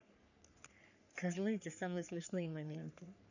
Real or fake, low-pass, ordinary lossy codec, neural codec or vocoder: fake; 7.2 kHz; none; codec, 44.1 kHz, 3.4 kbps, Pupu-Codec